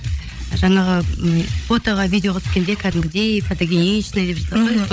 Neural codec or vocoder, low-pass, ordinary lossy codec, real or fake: codec, 16 kHz, 8 kbps, FreqCodec, larger model; none; none; fake